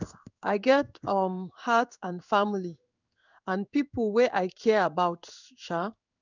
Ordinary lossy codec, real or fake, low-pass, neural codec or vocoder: none; fake; 7.2 kHz; codec, 16 kHz in and 24 kHz out, 1 kbps, XY-Tokenizer